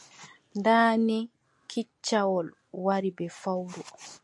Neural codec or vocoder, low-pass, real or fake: none; 10.8 kHz; real